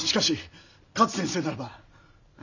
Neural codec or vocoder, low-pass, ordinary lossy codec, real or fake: none; 7.2 kHz; none; real